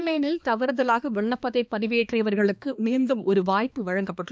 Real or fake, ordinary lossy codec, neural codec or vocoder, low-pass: fake; none; codec, 16 kHz, 2 kbps, X-Codec, HuBERT features, trained on balanced general audio; none